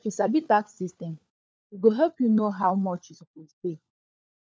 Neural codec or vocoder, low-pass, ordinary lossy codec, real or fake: codec, 16 kHz, 4 kbps, FunCodec, trained on LibriTTS, 50 frames a second; none; none; fake